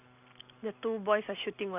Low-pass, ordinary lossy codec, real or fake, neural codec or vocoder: 3.6 kHz; none; real; none